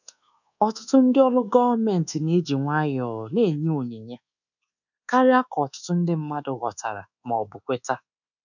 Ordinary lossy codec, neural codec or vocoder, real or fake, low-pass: none; codec, 24 kHz, 1.2 kbps, DualCodec; fake; 7.2 kHz